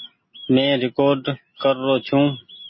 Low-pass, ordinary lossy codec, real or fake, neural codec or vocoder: 7.2 kHz; MP3, 24 kbps; real; none